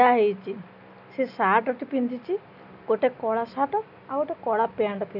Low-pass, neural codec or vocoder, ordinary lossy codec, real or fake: 5.4 kHz; none; none; real